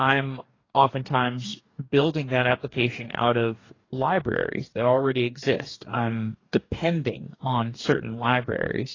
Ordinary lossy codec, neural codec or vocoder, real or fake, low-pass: AAC, 32 kbps; codec, 44.1 kHz, 2.6 kbps, DAC; fake; 7.2 kHz